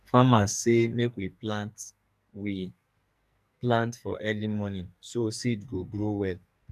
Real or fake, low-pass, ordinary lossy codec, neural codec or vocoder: fake; 14.4 kHz; none; codec, 44.1 kHz, 2.6 kbps, SNAC